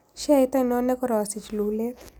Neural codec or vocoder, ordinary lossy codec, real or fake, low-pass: none; none; real; none